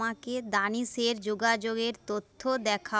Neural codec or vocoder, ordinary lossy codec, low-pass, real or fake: none; none; none; real